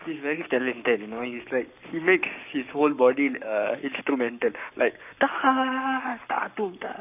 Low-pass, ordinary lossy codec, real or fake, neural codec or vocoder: 3.6 kHz; none; fake; codec, 44.1 kHz, 7.8 kbps, Pupu-Codec